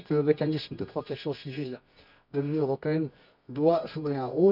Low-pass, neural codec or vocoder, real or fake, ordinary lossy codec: 5.4 kHz; codec, 24 kHz, 0.9 kbps, WavTokenizer, medium music audio release; fake; none